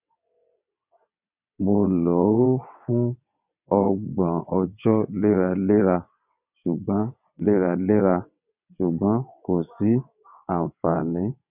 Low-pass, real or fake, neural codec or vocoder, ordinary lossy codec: 3.6 kHz; fake; vocoder, 22.05 kHz, 80 mel bands, WaveNeXt; none